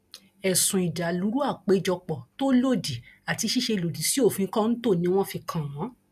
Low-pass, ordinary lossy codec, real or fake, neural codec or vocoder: 14.4 kHz; none; real; none